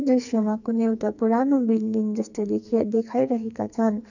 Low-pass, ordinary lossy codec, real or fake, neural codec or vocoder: 7.2 kHz; none; fake; codec, 44.1 kHz, 2.6 kbps, SNAC